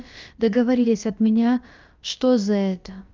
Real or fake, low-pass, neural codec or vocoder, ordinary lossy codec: fake; 7.2 kHz; codec, 16 kHz, about 1 kbps, DyCAST, with the encoder's durations; Opus, 24 kbps